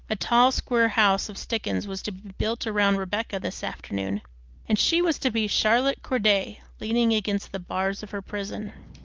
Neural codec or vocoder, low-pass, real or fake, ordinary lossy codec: none; 7.2 kHz; real; Opus, 24 kbps